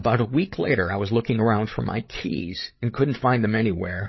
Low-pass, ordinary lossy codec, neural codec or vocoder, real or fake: 7.2 kHz; MP3, 24 kbps; codec, 16 kHz, 8 kbps, FreqCodec, larger model; fake